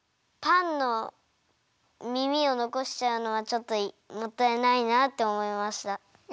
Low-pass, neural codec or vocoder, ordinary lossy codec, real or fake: none; none; none; real